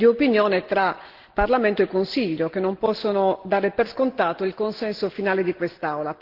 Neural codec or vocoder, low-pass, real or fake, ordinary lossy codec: none; 5.4 kHz; real; Opus, 16 kbps